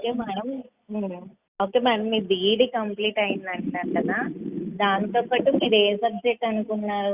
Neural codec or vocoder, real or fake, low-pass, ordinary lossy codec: none; real; 3.6 kHz; Opus, 64 kbps